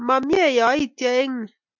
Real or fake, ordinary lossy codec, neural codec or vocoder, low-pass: real; MP3, 64 kbps; none; 7.2 kHz